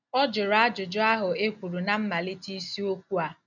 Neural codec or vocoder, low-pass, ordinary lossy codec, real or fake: none; 7.2 kHz; none; real